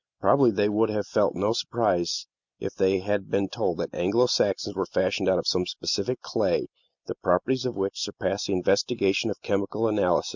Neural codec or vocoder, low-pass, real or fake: none; 7.2 kHz; real